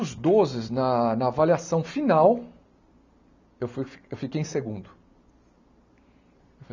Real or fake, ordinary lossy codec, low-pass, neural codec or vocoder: real; none; 7.2 kHz; none